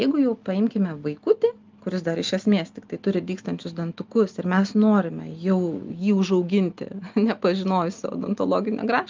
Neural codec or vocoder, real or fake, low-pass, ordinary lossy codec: none; real; 7.2 kHz; Opus, 24 kbps